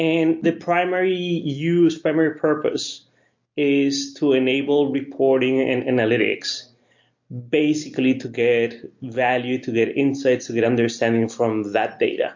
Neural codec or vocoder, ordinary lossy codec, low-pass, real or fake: none; MP3, 48 kbps; 7.2 kHz; real